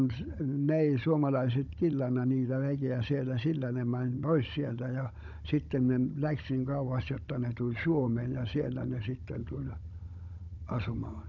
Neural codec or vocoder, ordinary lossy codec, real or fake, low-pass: codec, 16 kHz, 16 kbps, FunCodec, trained on Chinese and English, 50 frames a second; none; fake; 7.2 kHz